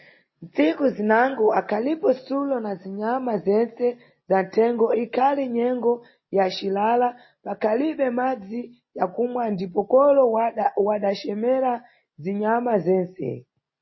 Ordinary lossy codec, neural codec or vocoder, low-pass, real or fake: MP3, 24 kbps; none; 7.2 kHz; real